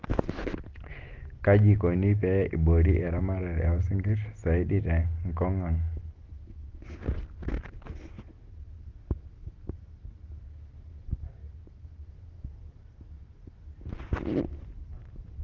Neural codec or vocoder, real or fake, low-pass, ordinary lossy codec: none; real; 7.2 kHz; Opus, 16 kbps